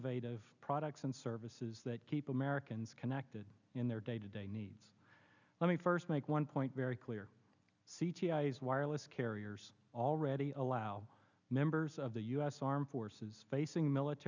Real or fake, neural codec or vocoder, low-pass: real; none; 7.2 kHz